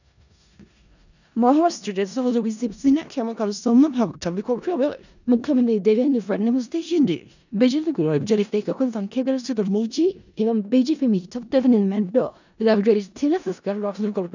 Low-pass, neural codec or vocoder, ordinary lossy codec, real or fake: 7.2 kHz; codec, 16 kHz in and 24 kHz out, 0.4 kbps, LongCat-Audio-Codec, four codebook decoder; none; fake